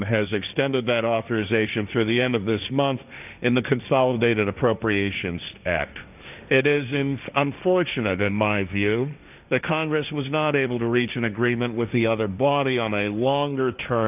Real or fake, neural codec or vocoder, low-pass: fake; codec, 16 kHz, 1.1 kbps, Voila-Tokenizer; 3.6 kHz